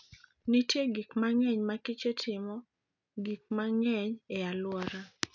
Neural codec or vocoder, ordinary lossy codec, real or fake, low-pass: none; none; real; 7.2 kHz